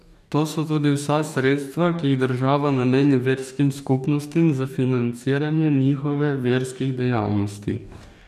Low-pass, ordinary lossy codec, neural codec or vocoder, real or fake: 14.4 kHz; none; codec, 44.1 kHz, 2.6 kbps, DAC; fake